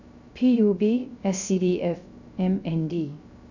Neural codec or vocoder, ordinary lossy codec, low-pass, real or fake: codec, 16 kHz, 0.3 kbps, FocalCodec; none; 7.2 kHz; fake